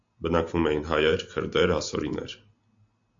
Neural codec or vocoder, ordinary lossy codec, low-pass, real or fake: none; AAC, 64 kbps; 7.2 kHz; real